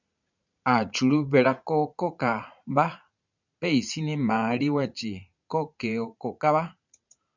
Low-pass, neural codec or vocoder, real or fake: 7.2 kHz; vocoder, 44.1 kHz, 80 mel bands, Vocos; fake